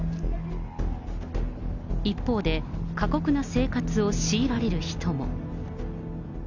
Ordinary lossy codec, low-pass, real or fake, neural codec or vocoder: none; 7.2 kHz; real; none